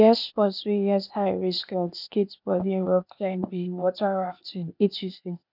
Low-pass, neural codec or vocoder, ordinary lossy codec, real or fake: 5.4 kHz; codec, 16 kHz, 0.8 kbps, ZipCodec; none; fake